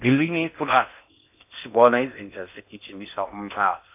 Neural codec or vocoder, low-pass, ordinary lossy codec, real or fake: codec, 16 kHz in and 24 kHz out, 0.6 kbps, FocalCodec, streaming, 4096 codes; 3.6 kHz; AAC, 32 kbps; fake